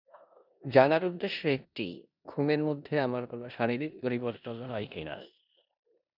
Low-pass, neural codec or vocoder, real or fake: 5.4 kHz; codec, 16 kHz in and 24 kHz out, 0.9 kbps, LongCat-Audio-Codec, four codebook decoder; fake